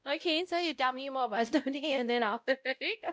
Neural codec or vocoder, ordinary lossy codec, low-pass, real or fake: codec, 16 kHz, 0.5 kbps, X-Codec, WavLM features, trained on Multilingual LibriSpeech; none; none; fake